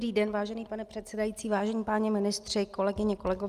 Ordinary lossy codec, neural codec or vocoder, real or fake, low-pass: Opus, 32 kbps; none; real; 14.4 kHz